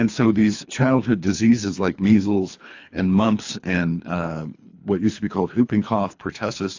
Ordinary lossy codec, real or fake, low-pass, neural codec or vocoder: AAC, 48 kbps; fake; 7.2 kHz; codec, 24 kHz, 3 kbps, HILCodec